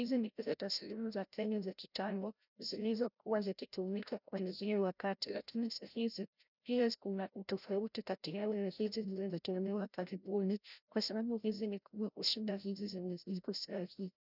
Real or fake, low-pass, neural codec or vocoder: fake; 5.4 kHz; codec, 16 kHz, 0.5 kbps, FreqCodec, larger model